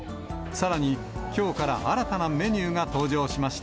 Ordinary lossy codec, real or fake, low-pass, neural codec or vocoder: none; real; none; none